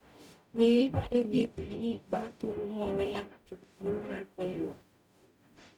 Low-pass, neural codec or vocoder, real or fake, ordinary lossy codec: 19.8 kHz; codec, 44.1 kHz, 0.9 kbps, DAC; fake; none